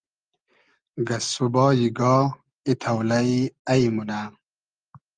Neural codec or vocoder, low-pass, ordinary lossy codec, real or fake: none; 9.9 kHz; Opus, 32 kbps; real